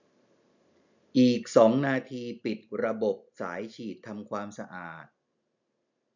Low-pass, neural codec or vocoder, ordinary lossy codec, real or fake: 7.2 kHz; none; none; real